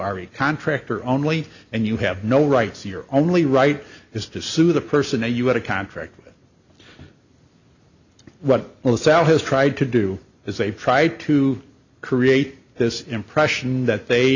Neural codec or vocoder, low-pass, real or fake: none; 7.2 kHz; real